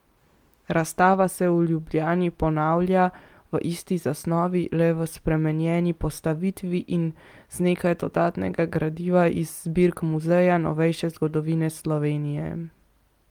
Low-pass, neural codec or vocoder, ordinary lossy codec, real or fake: 19.8 kHz; none; Opus, 32 kbps; real